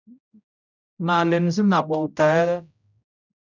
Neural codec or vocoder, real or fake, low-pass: codec, 16 kHz, 0.5 kbps, X-Codec, HuBERT features, trained on general audio; fake; 7.2 kHz